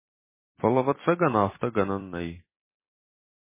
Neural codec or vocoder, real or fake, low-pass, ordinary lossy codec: vocoder, 44.1 kHz, 128 mel bands every 256 samples, BigVGAN v2; fake; 3.6 kHz; MP3, 16 kbps